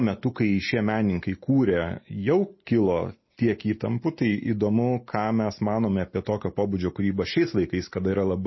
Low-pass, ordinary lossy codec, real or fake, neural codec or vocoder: 7.2 kHz; MP3, 24 kbps; real; none